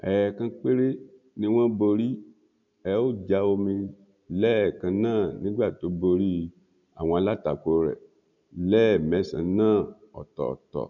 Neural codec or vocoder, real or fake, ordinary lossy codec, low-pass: none; real; none; 7.2 kHz